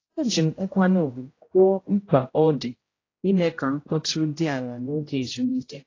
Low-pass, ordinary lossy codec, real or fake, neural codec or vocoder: 7.2 kHz; AAC, 32 kbps; fake; codec, 16 kHz, 0.5 kbps, X-Codec, HuBERT features, trained on general audio